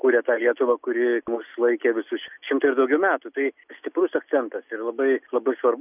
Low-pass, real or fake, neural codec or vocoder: 3.6 kHz; real; none